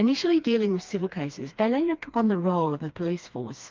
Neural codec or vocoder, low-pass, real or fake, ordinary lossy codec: codec, 16 kHz, 2 kbps, FreqCodec, smaller model; 7.2 kHz; fake; Opus, 24 kbps